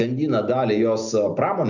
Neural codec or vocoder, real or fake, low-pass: none; real; 7.2 kHz